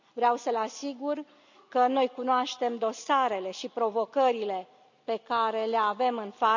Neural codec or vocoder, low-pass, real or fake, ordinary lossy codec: none; 7.2 kHz; real; none